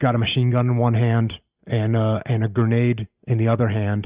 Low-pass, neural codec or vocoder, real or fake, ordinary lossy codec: 3.6 kHz; none; real; Opus, 64 kbps